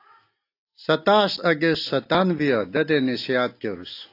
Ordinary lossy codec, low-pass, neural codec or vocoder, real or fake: AAC, 32 kbps; 5.4 kHz; none; real